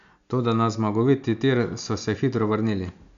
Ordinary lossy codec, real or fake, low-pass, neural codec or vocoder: none; real; 7.2 kHz; none